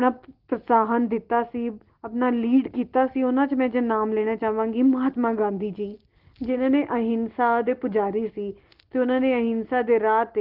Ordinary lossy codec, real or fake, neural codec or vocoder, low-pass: Opus, 16 kbps; real; none; 5.4 kHz